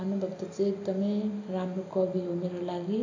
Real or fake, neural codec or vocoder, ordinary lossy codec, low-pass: real; none; AAC, 48 kbps; 7.2 kHz